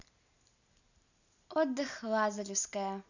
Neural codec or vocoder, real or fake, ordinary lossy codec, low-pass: none; real; none; 7.2 kHz